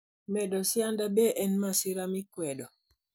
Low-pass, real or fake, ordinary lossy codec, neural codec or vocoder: none; real; none; none